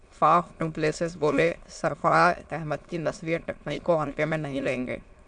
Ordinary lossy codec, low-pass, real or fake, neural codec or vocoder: MP3, 64 kbps; 9.9 kHz; fake; autoencoder, 22.05 kHz, a latent of 192 numbers a frame, VITS, trained on many speakers